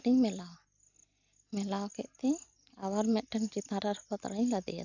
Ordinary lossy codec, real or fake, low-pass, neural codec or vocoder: none; real; 7.2 kHz; none